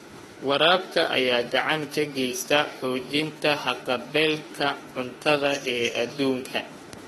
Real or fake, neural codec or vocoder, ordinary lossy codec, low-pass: fake; autoencoder, 48 kHz, 32 numbers a frame, DAC-VAE, trained on Japanese speech; AAC, 32 kbps; 19.8 kHz